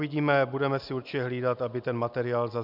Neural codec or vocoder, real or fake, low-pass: none; real; 5.4 kHz